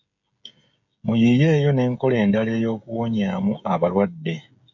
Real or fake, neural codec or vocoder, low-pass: fake; codec, 16 kHz, 16 kbps, FreqCodec, smaller model; 7.2 kHz